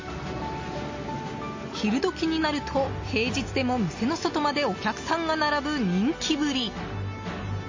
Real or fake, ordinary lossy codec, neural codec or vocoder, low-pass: real; MP3, 32 kbps; none; 7.2 kHz